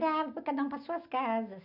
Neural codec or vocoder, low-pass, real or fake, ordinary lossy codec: none; 5.4 kHz; real; none